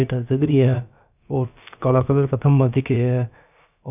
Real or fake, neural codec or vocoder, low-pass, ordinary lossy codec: fake; codec, 16 kHz, about 1 kbps, DyCAST, with the encoder's durations; 3.6 kHz; none